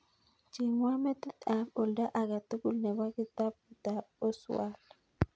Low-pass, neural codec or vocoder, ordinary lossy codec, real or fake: none; none; none; real